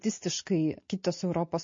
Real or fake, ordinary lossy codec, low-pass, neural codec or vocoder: real; MP3, 32 kbps; 7.2 kHz; none